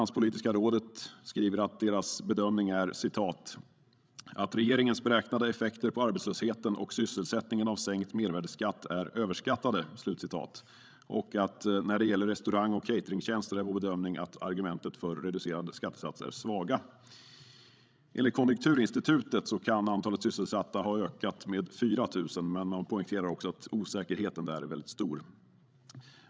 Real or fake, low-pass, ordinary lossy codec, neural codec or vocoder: fake; none; none; codec, 16 kHz, 16 kbps, FreqCodec, larger model